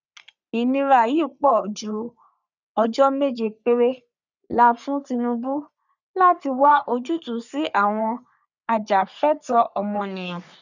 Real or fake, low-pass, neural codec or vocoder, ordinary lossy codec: fake; 7.2 kHz; codec, 44.1 kHz, 3.4 kbps, Pupu-Codec; none